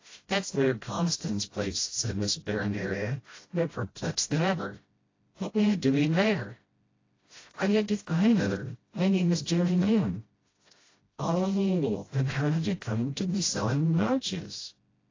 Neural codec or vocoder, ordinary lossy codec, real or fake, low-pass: codec, 16 kHz, 0.5 kbps, FreqCodec, smaller model; AAC, 32 kbps; fake; 7.2 kHz